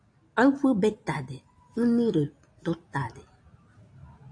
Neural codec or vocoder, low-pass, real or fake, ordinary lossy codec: none; 9.9 kHz; real; AAC, 48 kbps